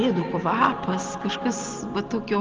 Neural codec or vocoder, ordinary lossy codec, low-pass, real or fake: none; Opus, 24 kbps; 7.2 kHz; real